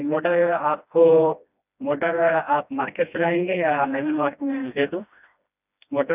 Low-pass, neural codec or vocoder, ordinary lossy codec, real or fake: 3.6 kHz; codec, 16 kHz, 1 kbps, FreqCodec, smaller model; none; fake